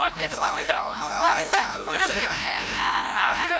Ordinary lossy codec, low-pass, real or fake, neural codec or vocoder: none; none; fake; codec, 16 kHz, 0.5 kbps, FreqCodec, larger model